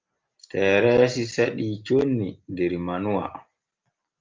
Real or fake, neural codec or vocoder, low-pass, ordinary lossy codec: real; none; 7.2 kHz; Opus, 32 kbps